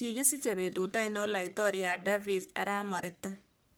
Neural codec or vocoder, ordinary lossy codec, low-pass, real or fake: codec, 44.1 kHz, 1.7 kbps, Pupu-Codec; none; none; fake